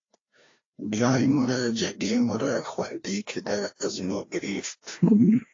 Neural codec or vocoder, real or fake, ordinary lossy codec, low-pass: codec, 16 kHz, 1 kbps, FreqCodec, larger model; fake; AAC, 32 kbps; 7.2 kHz